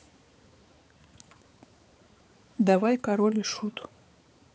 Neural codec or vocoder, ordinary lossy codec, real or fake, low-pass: codec, 16 kHz, 4 kbps, X-Codec, HuBERT features, trained on balanced general audio; none; fake; none